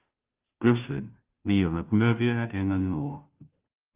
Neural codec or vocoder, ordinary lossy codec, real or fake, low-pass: codec, 16 kHz, 0.5 kbps, FunCodec, trained on Chinese and English, 25 frames a second; Opus, 24 kbps; fake; 3.6 kHz